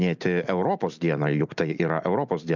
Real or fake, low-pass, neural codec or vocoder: real; 7.2 kHz; none